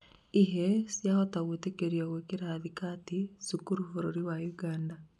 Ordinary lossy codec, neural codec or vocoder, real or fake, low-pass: none; none; real; none